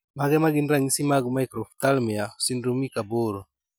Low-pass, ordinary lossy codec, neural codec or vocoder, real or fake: none; none; none; real